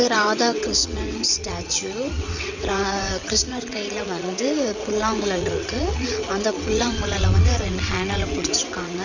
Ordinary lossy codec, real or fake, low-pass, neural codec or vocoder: none; fake; 7.2 kHz; vocoder, 22.05 kHz, 80 mel bands, WaveNeXt